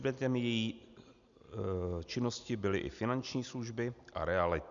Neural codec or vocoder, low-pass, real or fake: none; 7.2 kHz; real